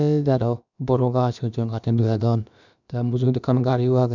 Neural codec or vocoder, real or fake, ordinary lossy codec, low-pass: codec, 16 kHz, about 1 kbps, DyCAST, with the encoder's durations; fake; none; 7.2 kHz